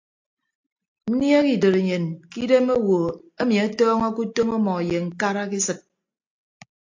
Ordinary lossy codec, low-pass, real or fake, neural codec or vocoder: AAC, 48 kbps; 7.2 kHz; real; none